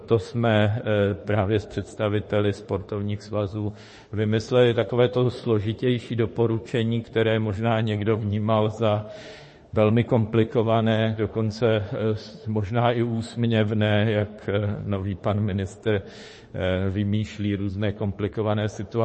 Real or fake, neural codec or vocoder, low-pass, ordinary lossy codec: fake; autoencoder, 48 kHz, 32 numbers a frame, DAC-VAE, trained on Japanese speech; 10.8 kHz; MP3, 32 kbps